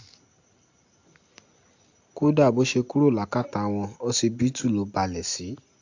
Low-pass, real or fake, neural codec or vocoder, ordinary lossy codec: 7.2 kHz; real; none; AAC, 48 kbps